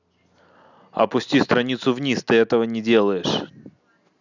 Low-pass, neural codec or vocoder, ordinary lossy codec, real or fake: 7.2 kHz; none; none; real